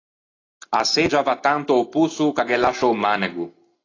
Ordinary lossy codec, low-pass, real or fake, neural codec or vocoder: AAC, 32 kbps; 7.2 kHz; real; none